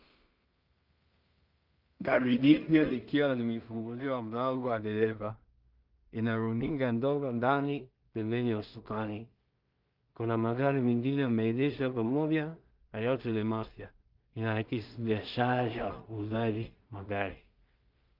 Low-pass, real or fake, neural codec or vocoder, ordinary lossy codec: 5.4 kHz; fake; codec, 16 kHz in and 24 kHz out, 0.4 kbps, LongCat-Audio-Codec, two codebook decoder; Opus, 24 kbps